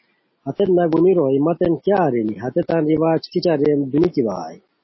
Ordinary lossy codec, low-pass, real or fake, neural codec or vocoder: MP3, 24 kbps; 7.2 kHz; real; none